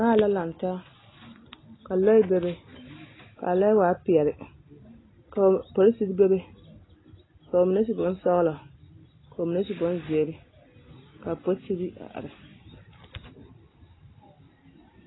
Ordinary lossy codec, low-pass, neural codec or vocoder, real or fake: AAC, 16 kbps; 7.2 kHz; none; real